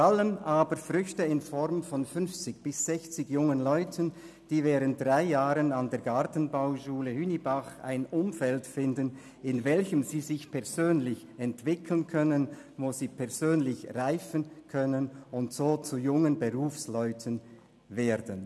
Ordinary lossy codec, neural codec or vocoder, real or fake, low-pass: none; none; real; none